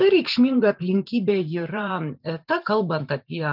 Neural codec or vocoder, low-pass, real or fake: vocoder, 44.1 kHz, 128 mel bands every 512 samples, BigVGAN v2; 5.4 kHz; fake